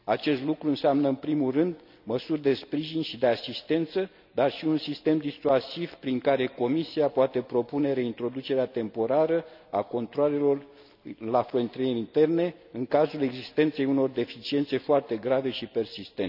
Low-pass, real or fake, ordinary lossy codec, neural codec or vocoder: 5.4 kHz; real; none; none